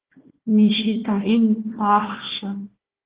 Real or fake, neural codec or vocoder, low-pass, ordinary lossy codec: fake; codec, 16 kHz, 1 kbps, FunCodec, trained on Chinese and English, 50 frames a second; 3.6 kHz; Opus, 16 kbps